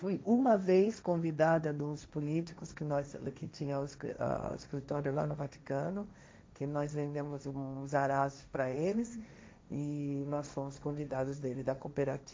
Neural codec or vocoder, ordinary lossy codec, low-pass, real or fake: codec, 16 kHz, 1.1 kbps, Voila-Tokenizer; none; none; fake